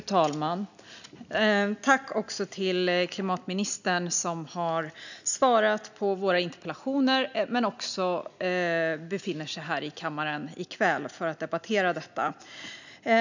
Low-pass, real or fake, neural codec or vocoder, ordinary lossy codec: 7.2 kHz; real; none; none